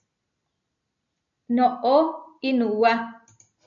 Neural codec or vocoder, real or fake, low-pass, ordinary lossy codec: none; real; 7.2 kHz; AAC, 64 kbps